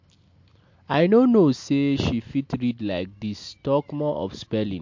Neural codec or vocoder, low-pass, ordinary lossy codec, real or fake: none; 7.2 kHz; MP3, 48 kbps; real